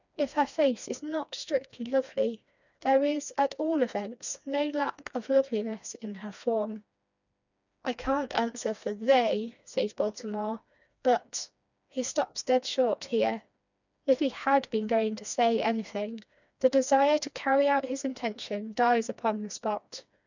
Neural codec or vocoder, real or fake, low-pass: codec, 16 kHz, 2 kbps, FreqCodec, smaller model; fake; 7.2 kHz